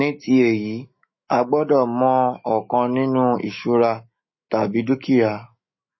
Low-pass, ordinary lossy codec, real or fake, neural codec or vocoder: 7.2 kHz; MP3, 24 kbps; fake; autoencoder, 48 kHz, 128 numbers a frame, DAC-VAE, trained on Japanese speech